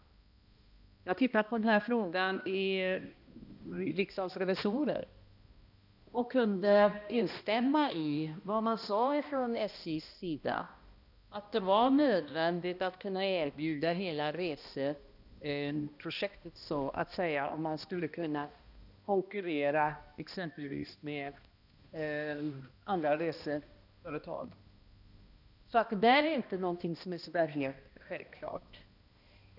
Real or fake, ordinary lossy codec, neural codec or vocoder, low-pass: fake; none; codec, 16 kHz, 1 kbps, X-Codec, HuBERT features, trained on balanced general audio; 5.4 kHz